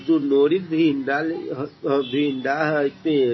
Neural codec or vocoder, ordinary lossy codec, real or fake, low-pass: none; MP3, 24 kbps; real; 7.2 kHz